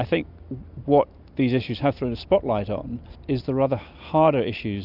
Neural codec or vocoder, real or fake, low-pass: none; real; 5.4 kHz